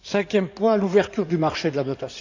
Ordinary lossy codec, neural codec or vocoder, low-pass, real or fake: none; codec, 16 kHz in and 24 kHz out, 2.2 kbps, FireRedTTS-2 codec; 7.2 kHz; fake